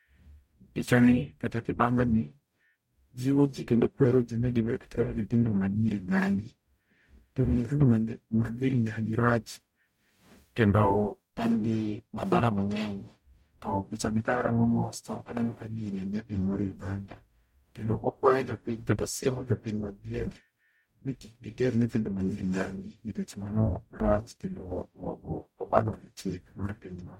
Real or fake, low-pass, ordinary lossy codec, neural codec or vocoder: fake; 19.8 kHz; MP3, 64 kbps; codec, 44.1 kHz, 0.9 kbps, DAC